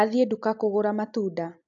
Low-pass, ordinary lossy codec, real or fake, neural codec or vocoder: 7.2 kHz; AAC, 64 kbps; real; none